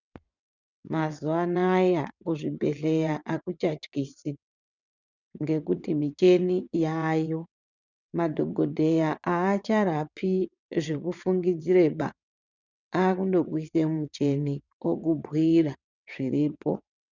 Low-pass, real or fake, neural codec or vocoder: 7.2 kHz; fake; vocoder, 22.05 kHz, 80 mel bands, WaveNeXt